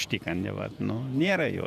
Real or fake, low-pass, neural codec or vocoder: fake; 14.4 kHz; vocoder, 48 kHz, 128 mel bands, Vocos